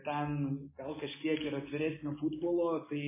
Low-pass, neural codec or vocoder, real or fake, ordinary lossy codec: 3.6 kHz; none; real; MP3, 16 kbps